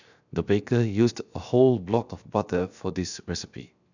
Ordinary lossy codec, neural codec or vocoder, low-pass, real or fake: none; codec, 16 kHz, 0.7 kbps, FocalCodec; 7.2 kHz; fake